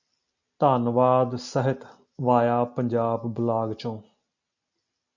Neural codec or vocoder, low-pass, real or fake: none; 7.2 kHz; real